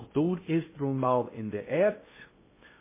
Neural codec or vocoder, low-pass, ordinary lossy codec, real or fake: codec, 16 kHz in and 24 kHz out, 0.6 kbps, FocalCodec, streaming, 2048 codes; 3.6 kHz; MP3, 16 kbps; fake